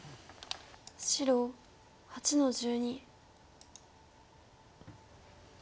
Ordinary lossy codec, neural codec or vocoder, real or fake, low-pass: none; none; real; none